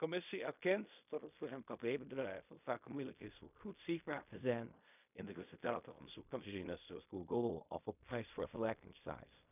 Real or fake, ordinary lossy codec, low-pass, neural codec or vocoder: fake; none; 3.6 kHz; codec, 16 kHz in and 24 kHz out, 0.4 kbps, LongCat-Audio-Codec, fine tuned four codebook decoder